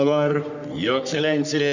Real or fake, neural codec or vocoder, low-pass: fake; codec, 44.1 kHz, 3.4 kbps, Pupu-Codec; 7.2 kHz